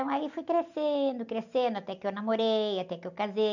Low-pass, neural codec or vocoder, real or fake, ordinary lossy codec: 7.2 kHz; none; real; none